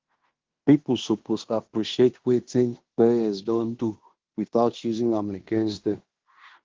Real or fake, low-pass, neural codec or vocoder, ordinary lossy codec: fake; 7.2 kHz; codec, 16 kHz in and 24 kHz out, 0.9 kbps, LongCat-Audio-Codec, fine tuned four codebook decoder; Opus, 16 kbps